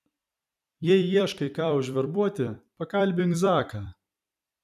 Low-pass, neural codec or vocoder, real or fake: 14.4 kHz; vocoder, 44.1 kHz, 128 mel bands every 256 samples, BigVGAN v2; fake